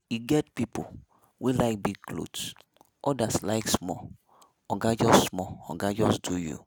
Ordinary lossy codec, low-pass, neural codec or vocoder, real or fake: none; none; none; real